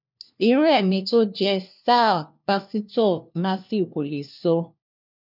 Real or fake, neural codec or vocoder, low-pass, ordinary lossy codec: fake; codec, 16 kHz, 1 kbps, FunCodec, trained on LibriTTS, 50 frames a second; 5.4 kHz; none